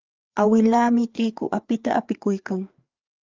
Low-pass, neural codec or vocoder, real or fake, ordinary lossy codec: 7.2 kHz; codec, 16 kHz, 4 kbps, FreqCodec, larger model; fake; Opus, 32 kbps